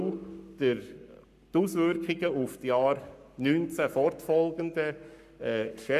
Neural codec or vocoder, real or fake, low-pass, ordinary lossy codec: codec, 44.1 kHz, 7.8 kbps, Pupu-Codec; fake; 14.4 kHz; none